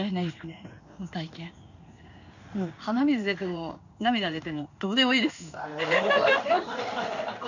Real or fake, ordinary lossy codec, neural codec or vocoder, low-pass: fake; none; codec, 16 kHz in and 24 kHz out, 1 kbps, XY-Tokenizer; 7.2 kHz